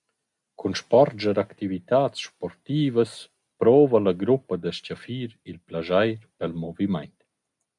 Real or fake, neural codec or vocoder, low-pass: real; none; 10.8 kHz